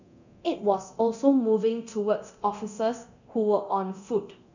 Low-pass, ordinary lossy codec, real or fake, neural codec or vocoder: 7.2 kHz; none; fake; codec, 24 kHz, 0.9 kbps, DualCodec